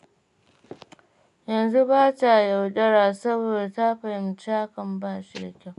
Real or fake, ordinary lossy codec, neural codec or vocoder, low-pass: real; none; none; 10.8 kHz